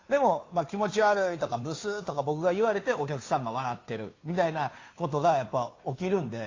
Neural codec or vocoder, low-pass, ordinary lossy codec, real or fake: codec, 16 kHz, 2 kbps, FunCodec, trained on Chinese and English, 25 frames a second; 7.2 kHz; AAC, 32 kbps; fake